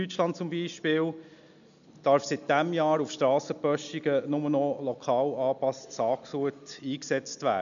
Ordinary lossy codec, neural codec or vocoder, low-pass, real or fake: none; none; 7.2 kHz; real